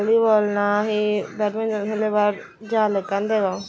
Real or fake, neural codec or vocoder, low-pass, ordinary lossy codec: real; none; none; none